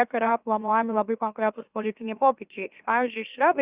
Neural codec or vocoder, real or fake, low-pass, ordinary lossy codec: autoencoder, 44.1 kHz, a latent of 192 numbers a frame, MeloTTS; fake; 3.6 kHz; Opus, 64 kbps